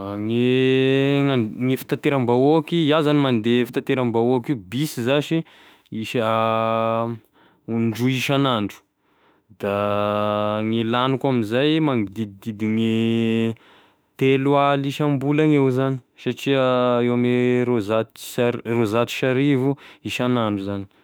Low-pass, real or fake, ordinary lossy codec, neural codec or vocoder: none; fake; none; autoencoder, 48 kHz, 32 numbers a frame, DAC-VAE, trained on Japanese speech